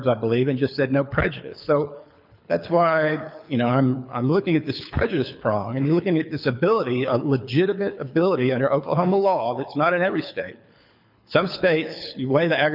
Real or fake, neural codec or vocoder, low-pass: fake; codec, 24 kHz, 6 kbps, HILCodec; 5.4 kHz